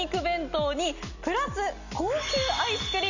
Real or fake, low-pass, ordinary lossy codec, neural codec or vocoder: real; 7.2 kHz; none; none